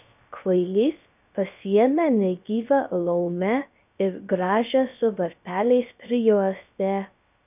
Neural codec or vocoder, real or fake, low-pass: codec, 16 kHz, 0.3 kbps, FocalCodec; fake; 3.6 kHz